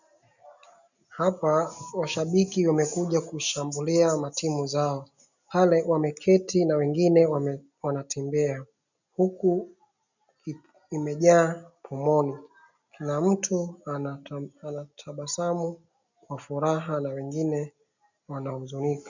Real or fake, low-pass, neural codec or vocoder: real; 7.2 kHz; none